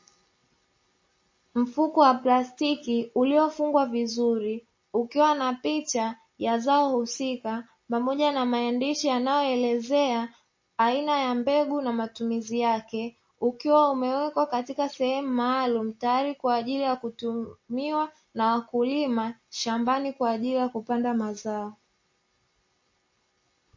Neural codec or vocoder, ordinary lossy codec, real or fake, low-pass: none; MP3, 32 kbps; real; 7.2 kHz